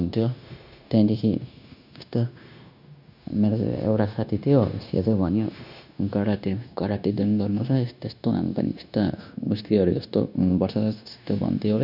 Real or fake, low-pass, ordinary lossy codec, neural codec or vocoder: fake; 5.4 kHz; none; codec, 16 kHz, 0.9 kbps, LongCat-Audio-Codec